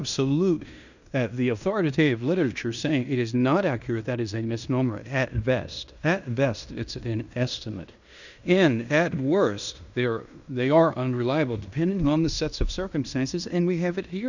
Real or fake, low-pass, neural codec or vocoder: fake; 7.2 kHz; codec, 16 kHz in and 24 kHz out, 0.9 kbps, LongCat-Audio-Codec, fine tuned four codebook decoder